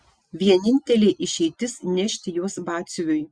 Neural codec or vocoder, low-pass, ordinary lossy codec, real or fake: none; 9.9 kHz; Opus, 64 kbps; real